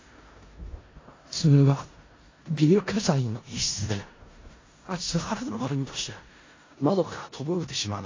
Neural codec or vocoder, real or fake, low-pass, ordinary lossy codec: codec, 16 kHz in and 24 kHz out, 0.4 kbps, LongCat-Audio-Codec, four codebook decoder; fake; 7.2 kHz; AAC, 32 kbps